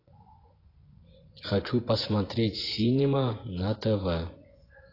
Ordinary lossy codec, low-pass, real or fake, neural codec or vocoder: AAC, 24 kbps; 5.4 kHz; fake; vocoder, 24 kHz, 100 mel bands, Vocos